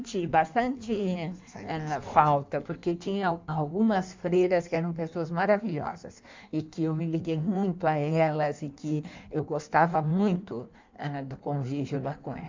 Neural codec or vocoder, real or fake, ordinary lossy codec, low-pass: codec, 16 kHz in and 24 kHz out, 1.1 kbps, FireRedTTS-2 codec; fake; MP3, 64 kbps; 7.2 kHz